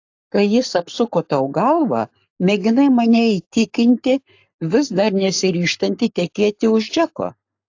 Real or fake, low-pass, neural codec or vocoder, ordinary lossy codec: fake; 7.2 kHz; codec, 44.1 kHz, 7.8 kbps, Pupu-Codec; AAC, 48 kbps